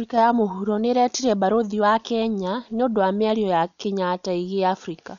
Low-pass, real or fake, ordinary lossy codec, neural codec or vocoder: 7.2 kHz; real; none; none